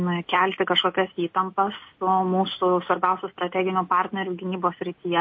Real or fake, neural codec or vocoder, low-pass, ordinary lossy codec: real; none; 7.2 kHz; MP3, 32 kbps